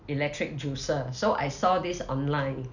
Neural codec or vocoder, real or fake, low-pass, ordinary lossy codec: none; real; 7.2 kHz; none